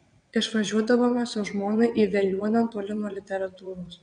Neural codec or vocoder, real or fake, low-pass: vocoder, 22.05 kHz, 80 mel bands, WaveNeXt; fake; 9.9 kHz